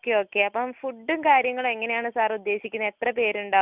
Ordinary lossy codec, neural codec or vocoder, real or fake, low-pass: Opus, 64 kbps; none; real; 3.6 kHz